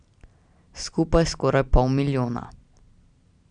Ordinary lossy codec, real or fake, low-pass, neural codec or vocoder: none; real; 9.9 kHz; none